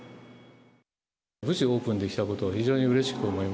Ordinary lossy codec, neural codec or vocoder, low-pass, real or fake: none; none; none; real